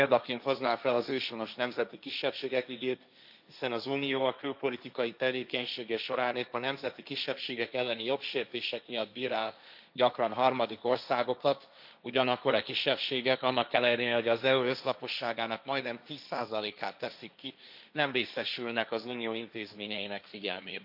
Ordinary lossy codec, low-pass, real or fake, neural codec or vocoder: none; 5.4 kHz; fake; codec, 16 kHz, 1.1 kbps, Voila-Tokenizer